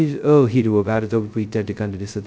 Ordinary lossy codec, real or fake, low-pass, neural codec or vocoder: none; fake; none; codec, 16 kHz, 0.2 kbps, FocalCodec